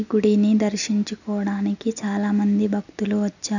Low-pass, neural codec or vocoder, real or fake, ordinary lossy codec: 7.2 kHz; none; real; none